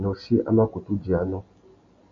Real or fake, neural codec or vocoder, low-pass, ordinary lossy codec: real; none; 7.2 kHz; MP3, 48 kbps